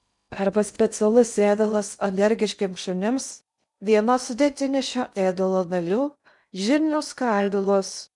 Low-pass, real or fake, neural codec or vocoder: 10.8 kHz; fake; codec, 16 kHz in and 24 kHz out, 0.6 kbps, FocalCodec, streaming, 2048 codes